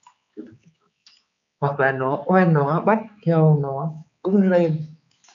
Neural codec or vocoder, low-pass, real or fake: codec, 16 kHz, 4 kbps, X-Codec, HuBERT features, trained on balanced general audio; 7.2 kHz; fake